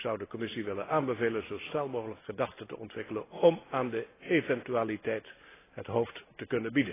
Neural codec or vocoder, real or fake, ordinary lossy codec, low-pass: none; real; AAC, 16 kbps; 3.6 kHz